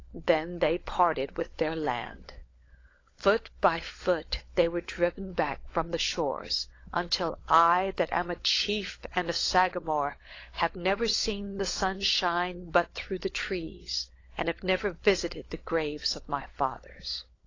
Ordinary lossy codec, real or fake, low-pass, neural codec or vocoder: AAC, 32 kbps; fake; 7.2 kHz; codec, 16 kHz, 16 kbps, FunCodec, trained on LibriTTS, 50 frames a second